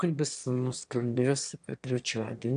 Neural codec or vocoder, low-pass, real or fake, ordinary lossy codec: autoencoder, 22.05 kHz, a latent of 192 numbers a frame, VITS, trained on one speaker; 9.9 kHz; fake; AAC, 96 kbps